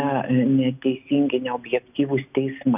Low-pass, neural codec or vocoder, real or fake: 3.6 kHz; none; real